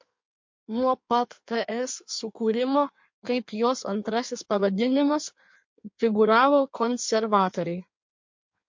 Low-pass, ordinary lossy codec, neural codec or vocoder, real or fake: 7.2 kHz; MP3, 48 kbps; codec, 16 kHz in and 24 kHz out, 1.1 kbps, FireRedTTS-2 codec; fake